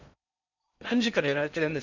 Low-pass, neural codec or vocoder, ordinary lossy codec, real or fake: 7.2 kHz; codec, 16 kHz in and 24 kHz out, 0.6 kbps, FocalCodec, streaming, 2048 codes; none; fake